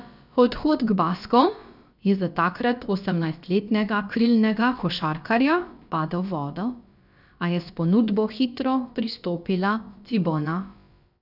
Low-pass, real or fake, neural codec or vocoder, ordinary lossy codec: 5.4 kHz; fake; codec, 16 kHz, about 1 kbps, DyCAST, with the encoder's durations; none